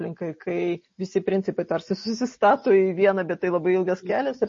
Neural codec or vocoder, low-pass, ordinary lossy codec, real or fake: none; 7.2 kHz; MP3, 32 kbps; real